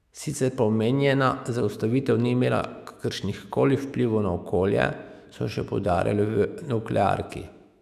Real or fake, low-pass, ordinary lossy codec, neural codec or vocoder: fake; 14.4 kHz; none; autoencoder, 48 kHz, 128 numbers a frame, DAC-VAE, trained on Japanese speech